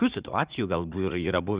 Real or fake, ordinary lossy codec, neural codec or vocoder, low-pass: fake; Opus, 64 kbps; vocoder, 44.1 kHz, 80 mel bands, Vocos; 3.6 kHz